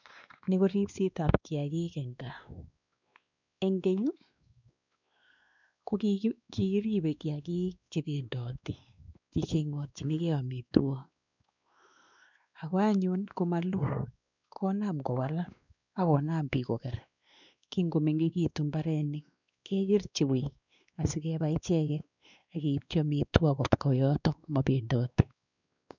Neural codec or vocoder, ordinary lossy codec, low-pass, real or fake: codec, 16 kHz, 2 kbps, X-Codec, WavLM features, trained on Multilingual LibriSpeech; none; 7.2 kHz; fake